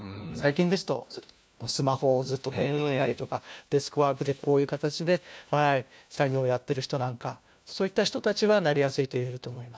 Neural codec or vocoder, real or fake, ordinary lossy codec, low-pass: codec, 16 kHz, 1 kbps, FunCodec, trained on LibriTTS, 50 frames a second; fake; none; none